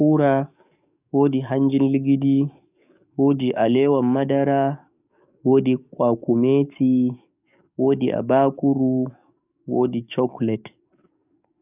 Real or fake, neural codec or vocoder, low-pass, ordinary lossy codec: fake; codec, 44.1 kHz, 7.8 kbps, DAC; 3.6 kHz; none